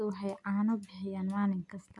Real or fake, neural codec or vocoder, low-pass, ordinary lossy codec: real; none; 10.8 kHz; none